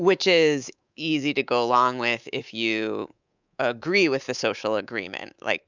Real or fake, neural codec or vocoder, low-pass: fake; codec, 24 kHz, 3.1 kbps, DualCodec; 7.2 kHz